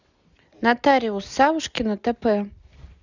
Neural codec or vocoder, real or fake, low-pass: none; real; 7.2 kHz